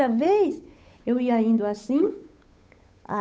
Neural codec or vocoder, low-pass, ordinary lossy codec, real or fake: codec, 16 kHz, 8 kbps, FunCodec, trained on Chinese and English, 25 frames a second; none; none; fake